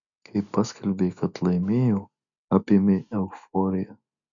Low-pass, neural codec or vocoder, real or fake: 7.2 kHz; none; real